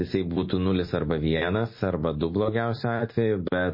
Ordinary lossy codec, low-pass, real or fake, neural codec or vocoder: MP3, 24 kbps; 5.4 kHz; real; none